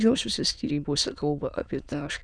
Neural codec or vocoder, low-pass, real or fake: autoencoder, 22.05 kHz, a latent of 192 numbers a frame, VITS, trained on many speakers; 9.9 kHz; fake